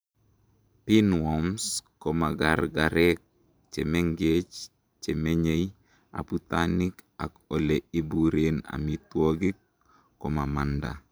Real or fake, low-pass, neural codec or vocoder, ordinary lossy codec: fake; none; vocoder, 44.1 kHz, 128 mel bands every 512 samples, BigVGAN v2; none